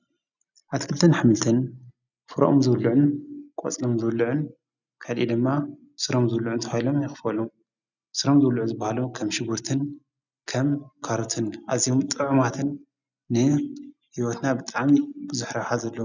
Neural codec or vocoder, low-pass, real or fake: none; 7.2 kHz; real